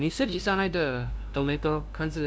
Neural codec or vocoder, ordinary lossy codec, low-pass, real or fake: codec, 16 kHz, 0.5 kbps, FunCodec, trained on LibriTTS, 25 frames a second; none; none; fake